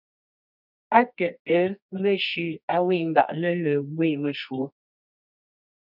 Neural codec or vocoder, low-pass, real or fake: codec, 24 kHz, 0.9 kbps, WavTokenizer, medium music audio release; 5.4 kHz; fake